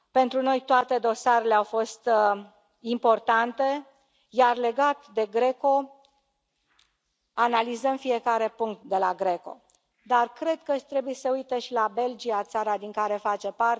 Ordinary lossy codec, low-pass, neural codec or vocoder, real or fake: none; none; none; real